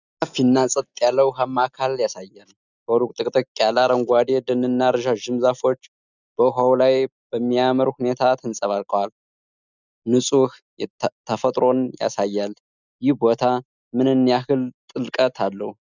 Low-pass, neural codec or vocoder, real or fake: 7.2 kHz; none; real